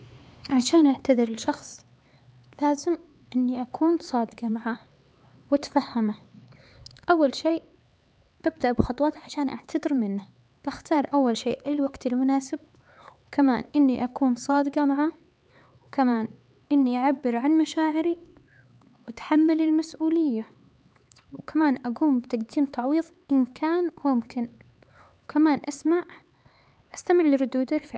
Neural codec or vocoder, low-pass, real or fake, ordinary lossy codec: codec, 16 kHz, 4 kbps, X-Codec, HuBERT features, trained on LibriSpeech; none; fake; none